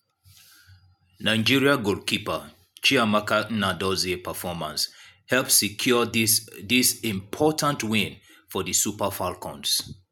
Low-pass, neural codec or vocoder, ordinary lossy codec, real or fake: none; none; none; real